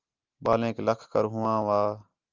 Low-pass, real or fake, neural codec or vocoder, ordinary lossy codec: 7.2 kHz; real; none; Opus, 32 kbps